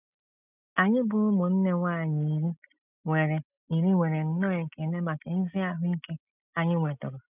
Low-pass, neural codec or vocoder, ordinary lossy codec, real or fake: 3.6 kHz; none; none; real